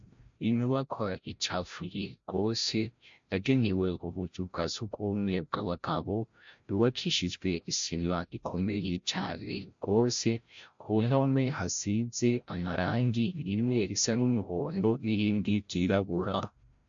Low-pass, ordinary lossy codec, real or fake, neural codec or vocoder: 7.2 kHz; MP3, 48 kbps; fake; codec, 16 kHz, 0.5 kbps, FreqCodec, larger model